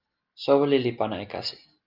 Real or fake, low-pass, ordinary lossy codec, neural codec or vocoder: real; 5.4 kHz; Opus, 24 kbps; none